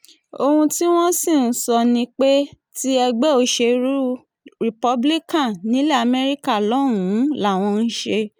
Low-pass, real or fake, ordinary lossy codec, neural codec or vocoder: none; real; none; none